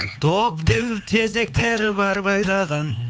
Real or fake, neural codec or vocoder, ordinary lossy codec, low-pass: fake; codec, 16 kHz, 4 kbps, X-Codec, HuBERT features, trained on LibriSpeech; none; none